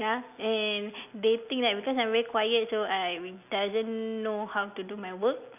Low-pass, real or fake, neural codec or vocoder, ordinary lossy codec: 3.6 kHz; real; none; none